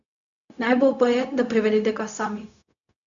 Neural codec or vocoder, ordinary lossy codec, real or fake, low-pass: codec, 16 kHz, 0.4 kbps, LongCat-Audio-Codec; none; fake; 7.2 kHz